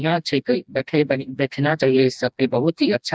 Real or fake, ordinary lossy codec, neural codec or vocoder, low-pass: fake; none; codec, 16 kHz, 1 kbps, FreqCodec, smaller model; none